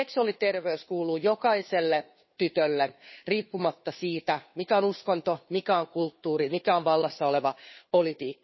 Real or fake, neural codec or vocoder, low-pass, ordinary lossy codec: fake; codec, 16 kHz, 2 kbps, FunCodec, trained on Chinese and English, 25 frames a second; 7.2 kHz; MP3, 24 kbps